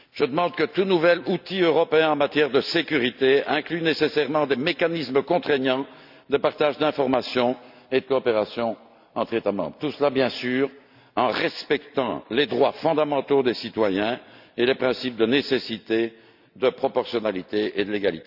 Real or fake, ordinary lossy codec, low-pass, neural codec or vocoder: real; none; 5.4 kHz; none